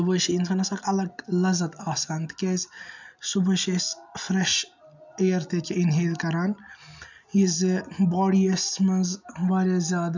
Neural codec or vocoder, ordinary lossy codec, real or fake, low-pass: none; none; real; 7.2 kHz